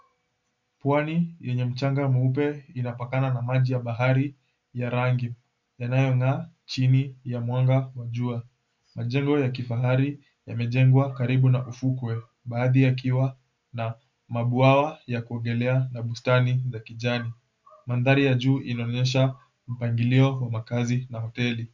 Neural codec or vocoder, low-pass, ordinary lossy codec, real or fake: none; 7.2 kHz; MP3, 64 kbps; real